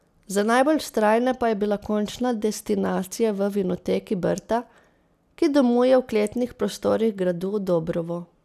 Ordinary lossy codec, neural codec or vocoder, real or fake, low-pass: none; none; real; 14.4 kHz